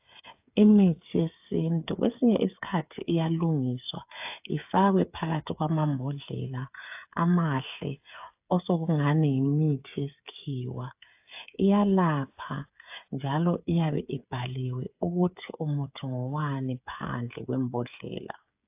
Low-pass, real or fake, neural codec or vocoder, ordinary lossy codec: 3.6 kHz; fake; codec, 16 kHz, 8 kbps, FreqCodec, smaller model; AAC, 32 kbps